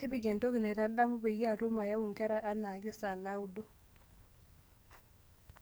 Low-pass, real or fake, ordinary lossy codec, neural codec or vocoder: none; fake; none; codec, 44.1 kHz, 2.6 kbps, SNAC